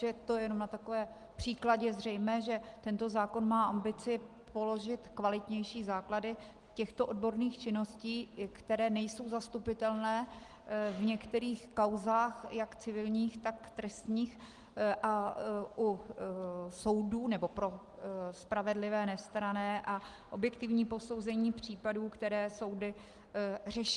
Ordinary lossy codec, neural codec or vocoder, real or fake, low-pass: Opus, 24 kbps; none; real; 10.8 kHz